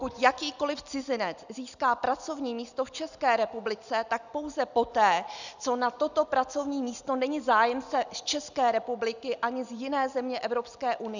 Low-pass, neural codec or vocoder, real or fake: 7.2 kHz; none; real